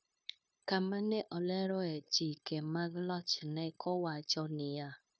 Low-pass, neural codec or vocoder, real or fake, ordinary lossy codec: none; codec, 16 kHz, 0.9 kbps, LongCat-Audio-Codec; fake; none